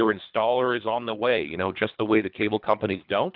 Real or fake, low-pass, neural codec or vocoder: fake; 5.4 kHz; codec, 24 kHz, 3 kbps, HILCodec